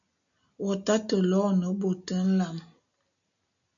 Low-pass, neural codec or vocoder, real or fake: 7.2 kHz; none; real